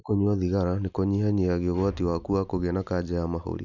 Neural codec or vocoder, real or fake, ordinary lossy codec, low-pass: none; real; none; 7.2 kHz